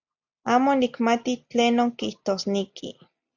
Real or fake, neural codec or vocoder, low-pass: real; none; 7.2 kHz